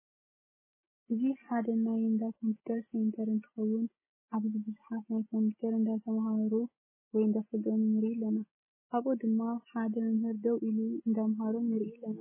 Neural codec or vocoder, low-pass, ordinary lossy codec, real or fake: none; 3.6 kHz; MP3, 16 kbps; real